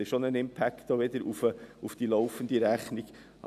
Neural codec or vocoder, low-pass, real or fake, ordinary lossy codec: none; 14.4 kHz; real; none